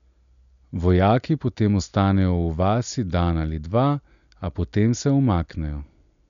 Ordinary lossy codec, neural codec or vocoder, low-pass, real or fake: none; none; 7.2 kHz; real